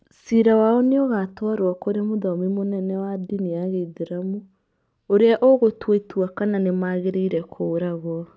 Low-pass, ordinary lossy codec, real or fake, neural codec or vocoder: none; none; real; none